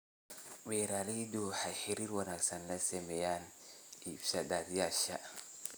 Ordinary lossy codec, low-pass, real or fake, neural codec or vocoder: none; none; real; none